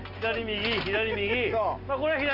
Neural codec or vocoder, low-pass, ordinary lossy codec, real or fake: none; 5.4 kHz; Opus, 32 kbps; real